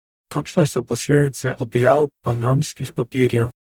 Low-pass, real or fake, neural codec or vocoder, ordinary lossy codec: 19.8 kHz; fake; codec, 44.1 kHz, 0.9 kbps, DAC; none